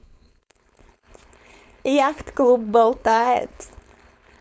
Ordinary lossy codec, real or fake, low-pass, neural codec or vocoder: none; fake; none; codec, 16 kHz, 4.8 kbps, FACodec